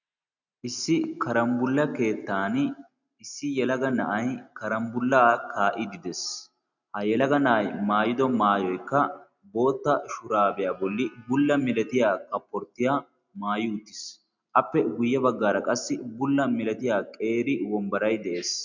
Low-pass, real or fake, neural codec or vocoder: 7.2 kHz; real; none